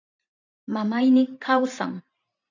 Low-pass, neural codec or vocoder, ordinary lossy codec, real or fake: 7.2 kHz; vocoder, 44.1 kHz, 128 mel bands every 512 samples, BigVGAN v2; AAC, 48 kbps; fake